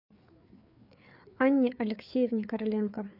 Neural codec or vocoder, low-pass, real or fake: codec, 16 kHz, 4 kbps, FreqCodec, larger model; 5.4 kHz; fake